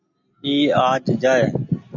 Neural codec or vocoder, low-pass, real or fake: none; 7.2 kHz; real